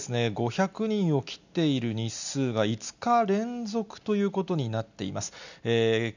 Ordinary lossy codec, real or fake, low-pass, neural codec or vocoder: none; real; 7.2 kHz; none